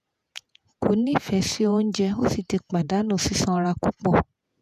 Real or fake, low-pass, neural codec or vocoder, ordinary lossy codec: fake; 14.4 kHz; vocoder, 48 kHz, 128 mel bands, Vocos; none